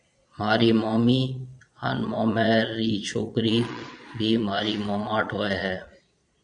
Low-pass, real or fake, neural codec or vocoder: 9.9 kHz; fake; vocoder, 22.05 kHz, 80 mel bands, Vocos